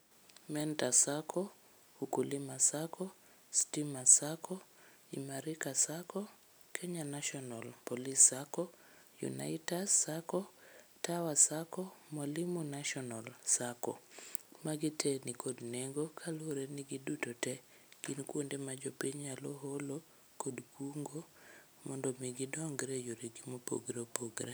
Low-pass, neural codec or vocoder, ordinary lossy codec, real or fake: none; none; none; real